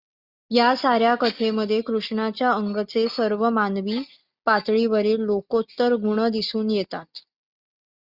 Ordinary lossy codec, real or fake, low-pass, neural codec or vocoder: Opus, 64 kbps; real; 5.4 kHz; none